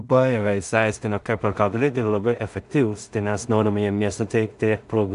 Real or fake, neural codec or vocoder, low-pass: fake; codec, 16 kHz in and 24 kHz out, 0.4 kbps, LongCat-Audio-Codec, two codebook decoder; 10.8 kHz